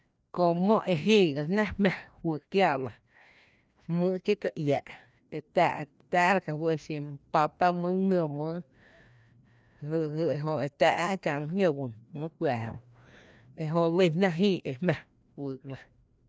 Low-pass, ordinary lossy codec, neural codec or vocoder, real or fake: none; none; codec, 16 kHz, 1 kbps, FreqCodec, larger model; fake